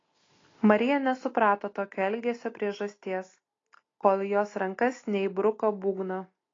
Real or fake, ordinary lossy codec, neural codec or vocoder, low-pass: real; AAC, 32 kbps; none; 7.2 kHz